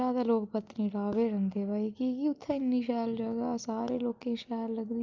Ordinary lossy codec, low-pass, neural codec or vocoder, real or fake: Opus, 24 kbps; 7.2 kHz; none; real